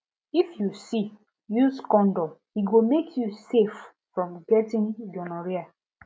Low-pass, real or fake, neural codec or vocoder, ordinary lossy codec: none; real; none; none